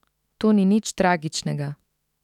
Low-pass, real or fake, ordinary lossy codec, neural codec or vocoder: 19.8 kHz; fake; none; autoencoder, 48 kHz, 128 numbers a frame, DAC-VAE, trained on Japanese speech